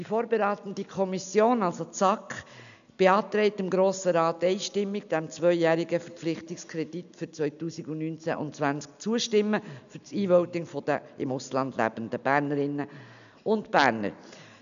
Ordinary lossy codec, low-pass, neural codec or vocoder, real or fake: none; 7.2 kHz; none; real